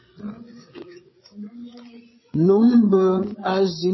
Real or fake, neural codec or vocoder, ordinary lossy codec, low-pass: fake; codec, 16 kHz, 8 kbps, FreqCodec, larger model; MP3, 24 kbps; 7.2 kHz